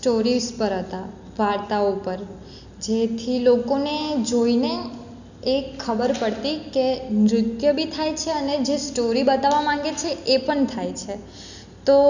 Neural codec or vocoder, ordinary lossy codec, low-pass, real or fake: none; none; 7.2 kHz; real